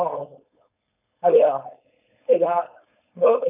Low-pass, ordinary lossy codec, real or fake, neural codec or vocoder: 3.6 kHz; none; fake; codec, 16 kHz, 4.8 kbps, FACodec